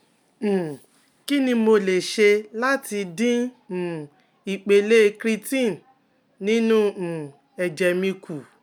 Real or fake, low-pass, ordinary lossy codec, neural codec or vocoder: real; 19.8 kHz; none; none